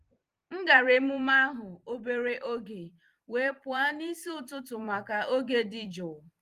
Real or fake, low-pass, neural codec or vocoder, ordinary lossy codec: fake; 14.4 kHz; vocoder, 44.1 kHz, 128 mel bands every 256 samples, BigVGAN v2; Opus, 24 kbps